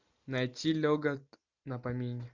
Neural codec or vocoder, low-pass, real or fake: none; 7.2 kHz; real